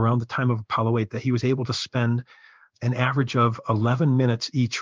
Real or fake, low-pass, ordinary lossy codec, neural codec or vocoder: fake; 7.2 kHz; Opus, 24 kbps; codec, 16 kHz in and 24 kHz out, 1 kbps, XY-Tokenizer